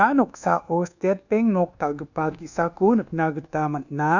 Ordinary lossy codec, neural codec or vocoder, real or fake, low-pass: none; codec, 24 kHz, 1.2 kbps, DualCodec; fake; 7.2 kHz